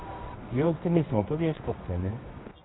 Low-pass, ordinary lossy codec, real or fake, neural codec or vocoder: 7.2 kHz; AAC, 16 kbps; fake; codec, 24 kHz, 0.9 kbps, WavTokenizer, medium music audio release